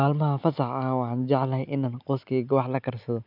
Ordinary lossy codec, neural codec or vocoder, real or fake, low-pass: none; none; real; 5.4 kHz